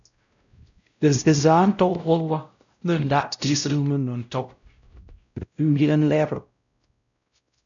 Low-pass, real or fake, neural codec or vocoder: 7.2 kHz; fake; codec, 16 kHz, 0.5 kbps, X-Codec, WavLM features, trained on Multilingual LibriSpeech